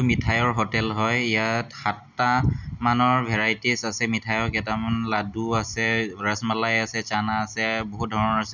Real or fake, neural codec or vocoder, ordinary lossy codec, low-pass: real; none; none; 7.2 kHz